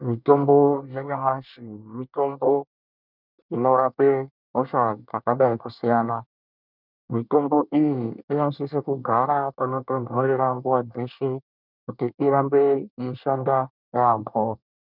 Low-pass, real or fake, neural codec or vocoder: 5.4 kHz; fake; codec, 24 kHz, 1 kbps, SNAC